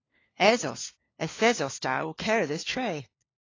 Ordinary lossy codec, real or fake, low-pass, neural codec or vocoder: AAC, 32 kbps; fake; 7.2 kHz; codec, 16 kHz, 4 kbps, FunCodec, trained on LibriTTS, 50 frames a second